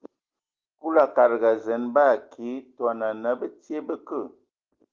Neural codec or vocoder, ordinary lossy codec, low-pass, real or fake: none; Opus, 24 kbps; 7.2 kHz; real